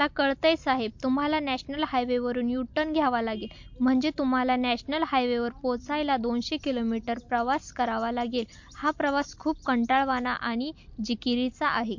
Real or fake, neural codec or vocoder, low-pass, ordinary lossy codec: real; none; 7.2 kHz; MP3, 48 kbps